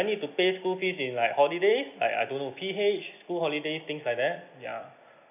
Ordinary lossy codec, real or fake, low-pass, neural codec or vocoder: none; real; 3.6 kHz; none